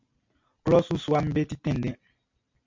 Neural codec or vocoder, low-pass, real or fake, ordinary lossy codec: none; 7.2 kHz; real; MP3, 48 kbps